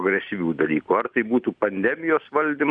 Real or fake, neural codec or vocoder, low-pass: real; none; 9.9 kHz